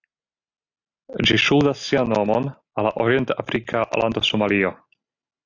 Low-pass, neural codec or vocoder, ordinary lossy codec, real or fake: 7.2 kHz; none; AAC, 48 kbps; real